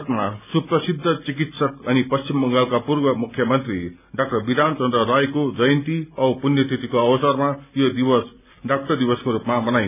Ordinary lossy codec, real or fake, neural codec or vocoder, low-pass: none; real; none; 3.6 kHz